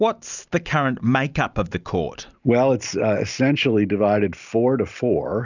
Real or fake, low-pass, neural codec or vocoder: real; 7.2 kHz; none